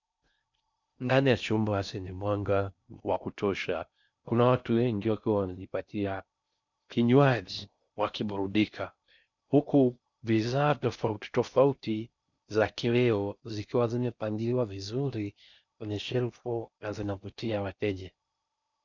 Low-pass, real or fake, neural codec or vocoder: 7.2 kHz; fake; codec, 16 kHz in and 24 kHz out, 0.6 kbps, FocalCodec, streaming, 4096 codes